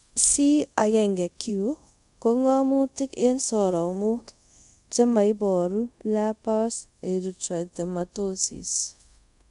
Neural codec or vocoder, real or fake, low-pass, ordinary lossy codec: codec, 24 kHz, 0.5 kbps, DualCodec; fake; 10.8 kHz; MP3, 96 kbps